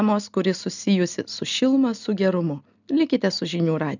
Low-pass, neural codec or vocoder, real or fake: 7.2 kHz; none; real